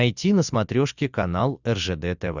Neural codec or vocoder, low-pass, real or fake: none; 7.2 kHz; real